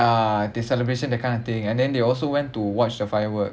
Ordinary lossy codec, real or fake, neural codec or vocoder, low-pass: none; real; none; none